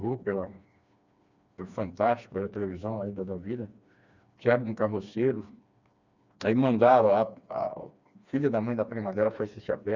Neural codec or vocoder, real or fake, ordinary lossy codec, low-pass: codec, 16 kHz, 2 kbps, FreqCodec, smaller model; fake; Opus, 64 kbps; 7.2 kHz